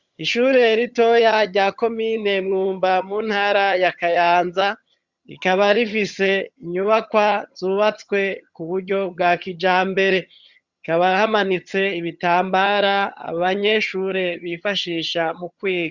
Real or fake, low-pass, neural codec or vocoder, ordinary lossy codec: fake; 7.2 kHz; vocoder, 22.05 kHz, 80 mel bands, HiFi-GAN; Opus, 64 kbps